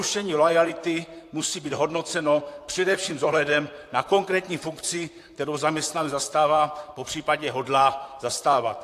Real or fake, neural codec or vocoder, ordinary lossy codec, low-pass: fake; vocoder, 44.1 kHz, 128 mel bands, Pupu-Vocoder; AAC, 64 kbps; 14.4 kHz